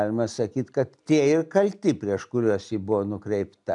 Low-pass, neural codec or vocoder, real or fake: 10.8 kHz; none; real